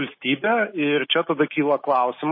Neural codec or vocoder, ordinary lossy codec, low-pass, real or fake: none; MP3, 24 kbps; 5.4 kHz; real